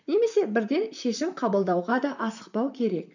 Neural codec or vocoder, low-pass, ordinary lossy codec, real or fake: vocoder, 44.1 kHz, 128 mel bands, Pupu-Vocoder; 7.2 kHz; none; fake